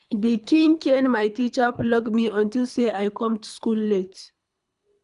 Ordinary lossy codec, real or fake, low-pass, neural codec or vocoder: none; fake; 10.8 kHz; codec, 24 kHz, 3 kbps, HILCodec